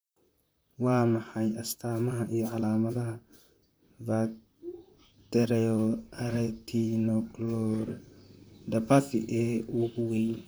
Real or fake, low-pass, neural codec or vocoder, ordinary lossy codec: fake; none; vocoder, 44.1 kHz, 128 mel bands, Pupu-Vocoder; none